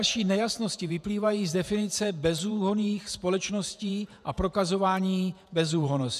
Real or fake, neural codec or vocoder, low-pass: fake; vocoder, 48 kHz, 128 mel bands, Vocos; 14.4 kHz